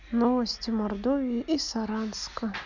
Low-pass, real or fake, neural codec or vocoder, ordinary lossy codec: 7.2 kHz; real; none; none